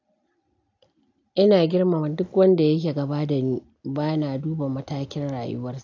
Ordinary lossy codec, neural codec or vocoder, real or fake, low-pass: AAC, 48 kbps; none; real; 7.2 kHz